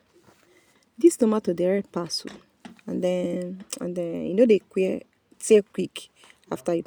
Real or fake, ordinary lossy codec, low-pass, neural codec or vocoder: real; none; none; none